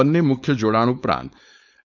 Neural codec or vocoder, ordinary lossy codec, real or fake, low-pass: codec, 16 kHz, 4.8 kbps, FACodec; none; fake; 7.2 kHz